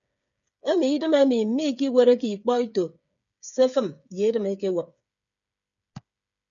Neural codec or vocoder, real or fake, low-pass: codec, 16 kHz, 8 kbps, FreqCodec, smaller model; fake; 7.2 kHz